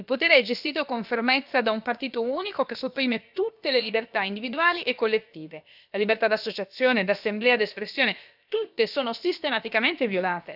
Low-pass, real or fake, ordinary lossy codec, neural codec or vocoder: 5.4 kHz; fake; none; codec, 16 kHz, about 1 kbps, DyCAST, with the encoder's durations